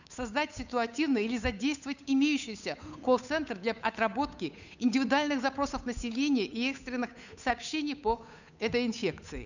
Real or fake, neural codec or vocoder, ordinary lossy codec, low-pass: fake; codec, 16 kHz, 8 kbps, FunCodec, trained on Chinese and English, 25 frames a second; none; 7.2 kHz